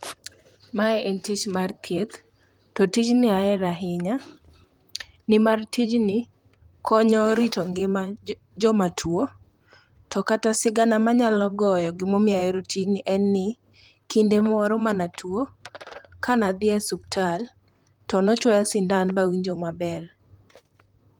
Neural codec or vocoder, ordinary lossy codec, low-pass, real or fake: vocoder, 44.1 kHz, 128 mel bands, Pupu-Vocoder; Opus, 32 kbps; 19.8 kHz; fake